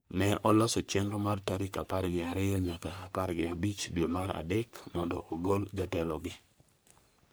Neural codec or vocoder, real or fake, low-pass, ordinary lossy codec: codec, 44.1 kHz, 3.4 kbps, Pupu-Codec; fake; none; none